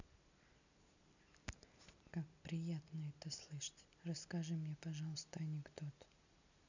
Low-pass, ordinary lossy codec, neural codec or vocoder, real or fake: 7.2 kHz; none; none; real